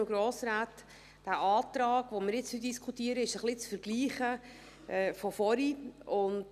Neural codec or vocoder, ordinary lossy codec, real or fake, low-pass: none; none; real; 14.4 kHz